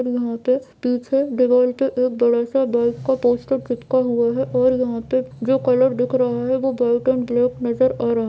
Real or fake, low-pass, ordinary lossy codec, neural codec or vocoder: real; none; none; none